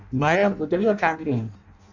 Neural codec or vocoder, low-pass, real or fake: codec, 16 kHz in and 24 kHz out, 0.6 kbps, FireRedTTS-2 codec; 7.2 kHz; fake